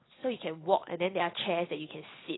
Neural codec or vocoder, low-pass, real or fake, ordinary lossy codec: none; 7.2 kHz; real; AAC, 16 kbps